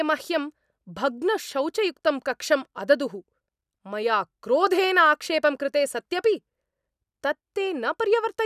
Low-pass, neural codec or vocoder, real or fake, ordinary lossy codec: 14.4 kHz; none; real; none